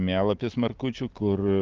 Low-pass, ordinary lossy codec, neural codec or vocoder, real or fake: 7.2 kHz; Opus, 24 kbps; none; real